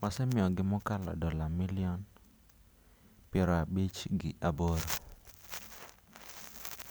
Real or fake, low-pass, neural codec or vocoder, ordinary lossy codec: real; none; none; none